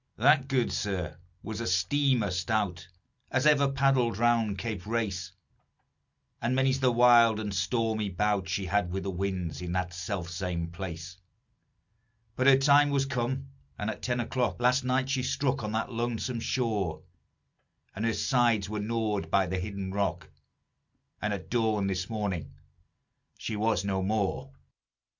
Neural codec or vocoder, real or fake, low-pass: none; real; 7.2 kHz